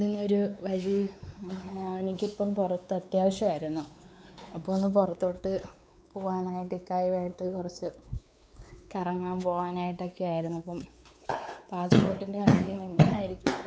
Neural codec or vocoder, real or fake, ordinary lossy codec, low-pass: codec, 16 kHz, 4 kbps, X-Codec, WavLM features, trained on Multilingual LibriSpeech; fake; none; none